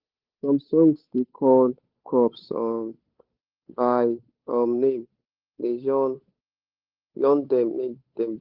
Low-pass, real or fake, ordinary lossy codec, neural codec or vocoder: 5.4 kHz; fake; Opus, 32 kbps; codec, 16 kHz, 8 kbps, FunCodec, trained on Chinese and English, 25 frames a second